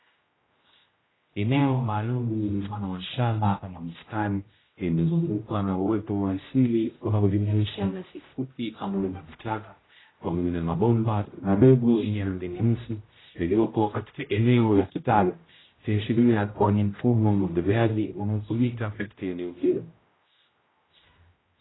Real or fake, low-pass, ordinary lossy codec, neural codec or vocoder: fake; 7.2 kHz; AAC, 16 kbps; codec, 16 kHz, 0.5 kbps, X-Codec, HuBERT features, trained on general audio